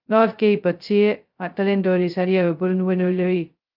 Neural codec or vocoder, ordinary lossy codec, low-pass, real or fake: codec, 16 kHz, 0.2 kbps, FocalCodec; Opus, 24 kbps; 5.4 kHz; fake